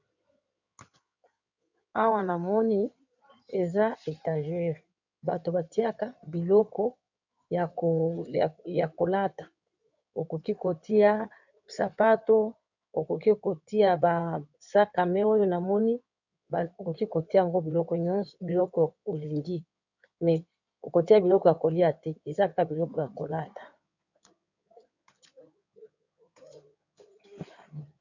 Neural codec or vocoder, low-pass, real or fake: codec, 16 kHz in and 24 kHz out, 2.2 kbps, FireRedTTS-2 codec; 7.2 kHz; fake